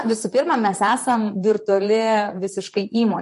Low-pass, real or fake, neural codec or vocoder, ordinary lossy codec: 14.4 kHz; fake; vocoder, 44.1 kHz, 128 mel bands, Pupu-Vocoder; MP3, 48 kbps